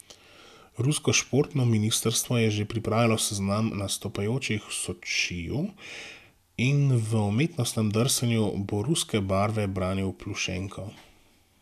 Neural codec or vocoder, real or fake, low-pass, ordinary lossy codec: none; real; 14.4 kHz; none